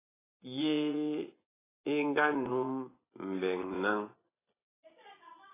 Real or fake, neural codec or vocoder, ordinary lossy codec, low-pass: fake; vocoder, 24 kHz, 100 mel bands, Vocos; AAC, 16 kbps; 3.6 kHz